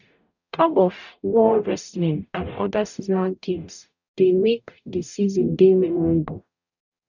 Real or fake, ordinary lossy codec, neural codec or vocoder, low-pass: fake; none; codec, 44.1 kHz, 0.9 kbps, DAC; 7.2 kHz